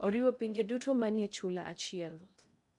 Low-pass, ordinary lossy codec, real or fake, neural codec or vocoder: 10.8 kHz; none; fake; codec, 16 kHz in and 24 kHz out, 0.6 kbps, FocalCodec, streaming, 2048 codes